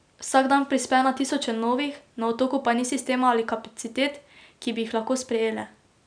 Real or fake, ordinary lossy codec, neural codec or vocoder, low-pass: real; none; none; 9.9 kHz